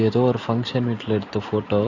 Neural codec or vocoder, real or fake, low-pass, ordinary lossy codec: none; real; 7.2 kHz; AAC, 48 kbps